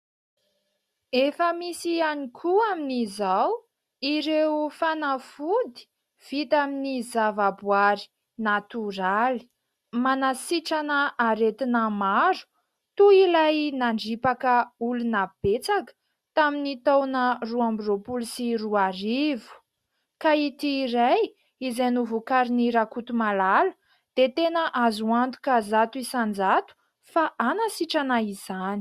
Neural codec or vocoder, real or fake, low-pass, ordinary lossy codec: none; real; 14.4 kHz; Opus, 64 kbps